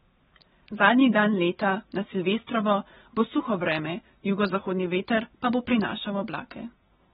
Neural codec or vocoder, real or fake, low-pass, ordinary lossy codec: vocoder, 44.1 kHz, 128 mel bands every 256 samples, BigVGAN v2; fake; 19.8 kHz; AAC, 16 kbps